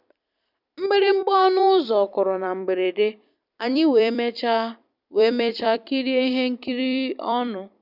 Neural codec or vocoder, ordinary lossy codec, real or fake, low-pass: vocoder, 44.1 kHz, 128 mel bands every 256 samples, BigVGAN v2; none; fake; 5.4 kHz